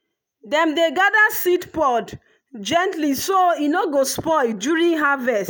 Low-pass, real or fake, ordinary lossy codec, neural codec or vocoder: none; real; none; none